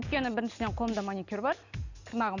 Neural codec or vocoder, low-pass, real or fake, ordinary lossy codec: none; 7.2 kHz; real; none